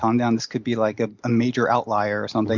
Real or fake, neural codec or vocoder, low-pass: real; none; 7.2 kHz